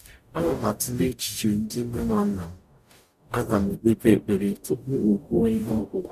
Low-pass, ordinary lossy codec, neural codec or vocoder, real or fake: 14.4 kHz; none; codec, 44.1 kHz, 0.9 kbps, DAC; fake